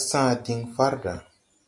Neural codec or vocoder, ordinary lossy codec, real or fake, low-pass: none; MP3, 64 kbps; real; 10.8 kHz